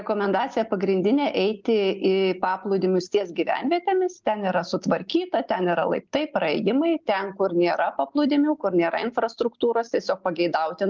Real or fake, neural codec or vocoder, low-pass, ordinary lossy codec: real; none; 7.2 kHz; Opus, 24 kbps